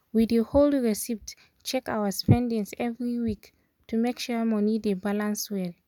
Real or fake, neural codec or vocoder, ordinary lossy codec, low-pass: real; none; none; 19.8 kHz